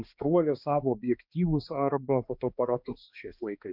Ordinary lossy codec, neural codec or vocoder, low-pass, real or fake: MP3, 48 kbps; codec, 16 kHz, 2 kbps, X-Codec, HuBERT features, trained on balanced general audio; 5.4 kHz; fake